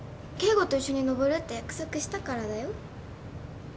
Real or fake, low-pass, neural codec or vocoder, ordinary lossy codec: real; none; none; none